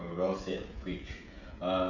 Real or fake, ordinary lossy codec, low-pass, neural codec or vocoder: fake; none; 7.2 kHz; codec, 16 kHz, 16 kbps, FreqCodec, smaller model